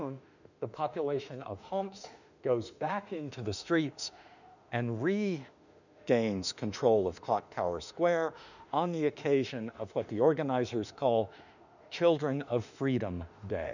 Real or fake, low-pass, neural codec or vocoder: fake; 7.2 kHz; autoencoder, 48 kHz, 32 numbers a frame, DAC-VAE, trained on Japanese speech